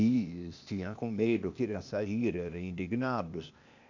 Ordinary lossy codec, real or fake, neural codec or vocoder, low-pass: none; fake; codec, 16 kHz, 0.8 kbps, ZipCodec; 7.2 kHz